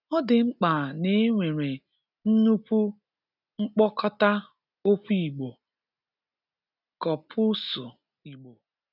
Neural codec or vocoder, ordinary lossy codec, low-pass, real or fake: none; none; 5.4 kHz; real